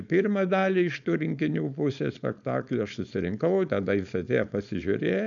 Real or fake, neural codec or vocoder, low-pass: fake; codec, 16 kHz, 4.8 kbps, FACodec; 7.2 kHz